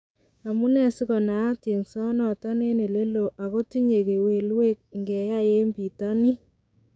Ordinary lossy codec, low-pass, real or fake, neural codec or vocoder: none; none; fake; codec, 16 kHz, 6 kbps, DAC